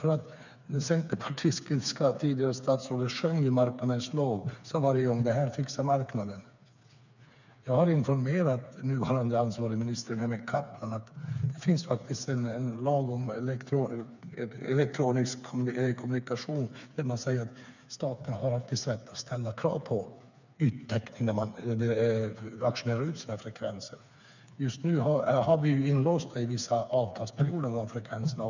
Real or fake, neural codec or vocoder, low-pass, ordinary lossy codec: fake; codec, 16 kHz, 4 kbps, FreqCodec, smaller model; 7.2 kHz; none